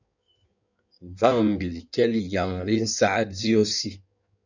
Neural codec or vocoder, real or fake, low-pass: codec, 16 kHz in and 24 kHz out, 1.1 kbps, FireRedTTS-2 codec; fake; 7.2 kHz